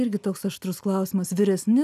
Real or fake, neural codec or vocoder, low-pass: real; none; 14.4 kHz